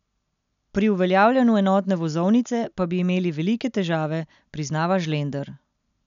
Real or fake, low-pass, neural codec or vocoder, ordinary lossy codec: real; 7.2 kHz; none; none